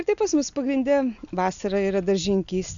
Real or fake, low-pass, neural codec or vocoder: real; 7.2 kHz; none